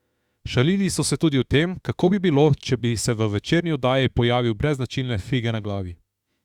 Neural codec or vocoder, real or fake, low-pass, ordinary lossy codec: autoencoder, 48 kHz, 32 numbers a frame, DAC-VAE, trained on Japanese speech; fake; 19.8 kHz; Opus, 64 kbps